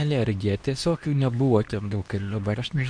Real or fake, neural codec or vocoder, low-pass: fake; codec, 24 kHz, 0.9 kbps, WavTokenizer, medium speech release version 2; 9.9 kHz